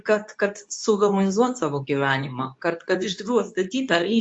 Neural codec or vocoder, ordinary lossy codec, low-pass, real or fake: codec, 24 kHz, 0.9 kbps, WavTokenizer, medium speech release version 2; MP3, 48 kbps; 10.8 kHz; fake